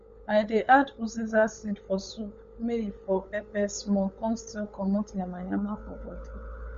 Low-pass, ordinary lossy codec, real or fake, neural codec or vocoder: 7.2 kHz; MP3, 48 kbps; fake; codec, 16 kHz, 16 kbps, FunCodec, trained on LibriTTS, 50 frames a second